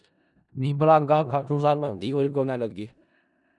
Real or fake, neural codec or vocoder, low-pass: fake; codec, 16 kHz in and 24 kHz out, 0.4 kbps, LongCat-Audio-Codec, four codebook decoder; 10.8 kHz